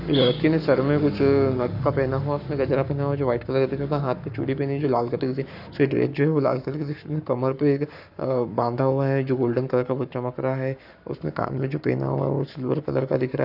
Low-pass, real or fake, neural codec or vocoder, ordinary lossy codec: 5.4 kHz; fake; codec, 44.1 kHz, 7.8 kbps, Pupu-Codec; none